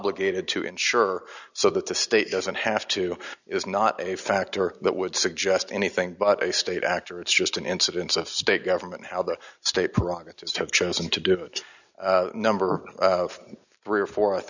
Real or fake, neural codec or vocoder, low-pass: real; none; 7.2 kHz